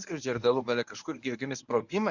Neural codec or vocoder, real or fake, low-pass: codec, 24 kHz, 0.9 kbps, WavTokenizer, medium speech release version 1; fake; 7.2 kHz